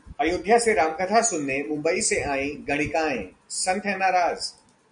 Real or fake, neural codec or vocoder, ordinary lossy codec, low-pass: real; none; MP3, 96 kbps; 9.9 kHz